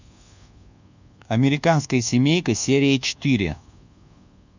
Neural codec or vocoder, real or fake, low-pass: codec, 24 kHz, 1.2 kbps, DualCodec; fake; 7.2 kHz